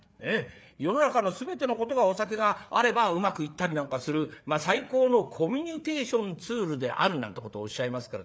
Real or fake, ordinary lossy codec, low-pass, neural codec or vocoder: fake; none; none; codec, 16 kHz, 4 kbps, FreqCodec, larger model